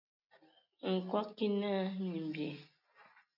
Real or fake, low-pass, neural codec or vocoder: real; 5.4 kHz; none